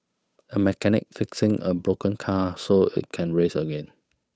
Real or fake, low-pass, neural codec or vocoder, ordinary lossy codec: fake; none; codec, 16 kHz, 8 kbps, FunCodec, trained on Chinese and English, 25 frames a second; none